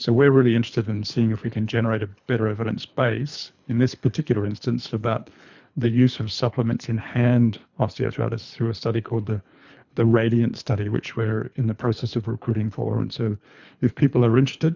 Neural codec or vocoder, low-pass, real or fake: codec, 24 kHz, 3 kbps, HILCodec; 7.2 kHz; fake